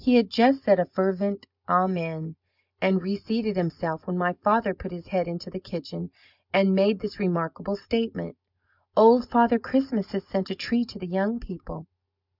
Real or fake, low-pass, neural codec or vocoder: real; 5.4 kHz; none